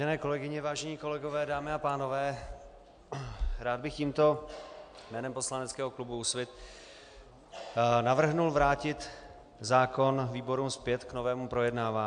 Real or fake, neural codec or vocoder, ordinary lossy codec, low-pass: real; none; AAC, 64 kbps; 9.9 kHz